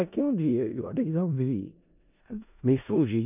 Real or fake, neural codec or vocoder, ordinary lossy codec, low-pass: fake; codec, 16 kHz in and 24 kHz out, 0.4 kbps, LongCat-Audio-Codec, four codebook decoder; none; 3.6 kHz